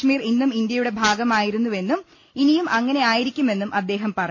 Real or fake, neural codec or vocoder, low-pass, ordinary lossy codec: real; none; 7.2 kHz; MP3, 48 kbps